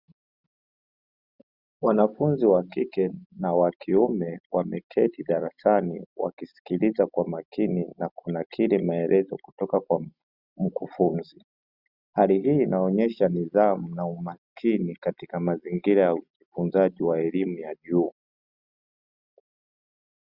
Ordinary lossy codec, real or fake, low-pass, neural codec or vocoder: Opus, 64 kbps; real; 5.4 kHz; none